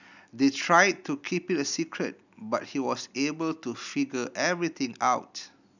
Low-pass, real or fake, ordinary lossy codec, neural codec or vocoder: 7.2 kHz; real; none; none